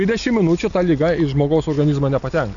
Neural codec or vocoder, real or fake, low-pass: none; real; 7.2 kHz